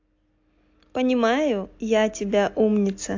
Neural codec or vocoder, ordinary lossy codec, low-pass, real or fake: none; AAC, 48 kbps; 7.2 kHz; real